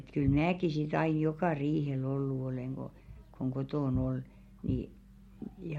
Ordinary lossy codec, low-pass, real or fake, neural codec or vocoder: MP3, 64 kbps; 19.8 kHz; real; none